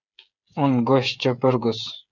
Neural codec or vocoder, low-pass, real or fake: codec, 16 kHz, 8 kbps, FreqCodec, smaller model; 7.2 kHz; fake